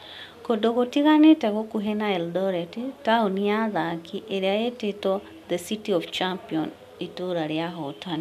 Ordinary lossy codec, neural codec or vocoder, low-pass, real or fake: none; none; 14.4 kHz; real